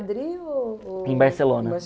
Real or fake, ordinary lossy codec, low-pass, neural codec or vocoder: real; none; none; none